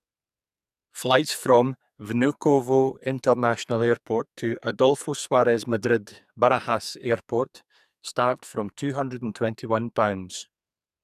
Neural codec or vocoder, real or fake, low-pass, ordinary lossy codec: codec, 44.1 kHz, 2.6 kbps, SNAC; fake; 14.4 kHz; none